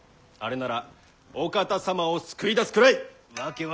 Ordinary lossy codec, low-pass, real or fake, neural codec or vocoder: none; none; real; none